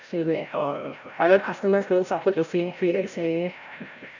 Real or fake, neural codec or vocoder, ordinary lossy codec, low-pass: fake; codec, 16 kHz, 0.5 kbps, FreqCodec, larger model; none; 7.2 kHz